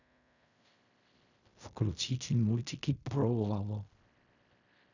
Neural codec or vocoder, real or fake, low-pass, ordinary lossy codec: codec, 16 kHz in and 24 kHz out, 0.4 kbps, LongCat-Audio-Codec, fine tuned four codebook decoder; fake; 7.2 kHz; none